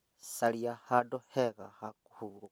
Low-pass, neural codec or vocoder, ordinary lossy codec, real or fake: none; none; none; real